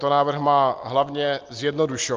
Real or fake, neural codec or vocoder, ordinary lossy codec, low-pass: real; none; Opus, 32 kbps; 7.2 kHz